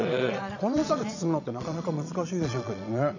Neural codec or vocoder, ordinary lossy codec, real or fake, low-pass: vocoder, 22.05 kHz, 80 mel bands, Vocos; none; fake; 7.2 kHz